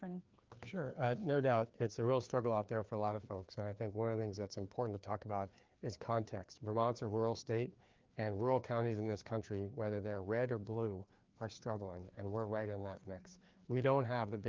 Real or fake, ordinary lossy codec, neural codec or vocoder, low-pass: fake; Opus, 32 kbps; codec, 16 kHz, 2 kbps, FreqCodec, larger model; 7.2 kHz